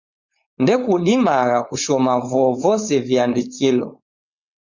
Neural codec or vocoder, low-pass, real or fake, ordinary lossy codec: codec, 16 kHz, 4.8 kbps, FACodec; 7.2 kHz; fake; Opus, 64 kbps